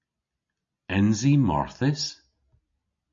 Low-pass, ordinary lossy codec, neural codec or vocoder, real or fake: 7.2 kHz; MP3, 48 kbps; none; real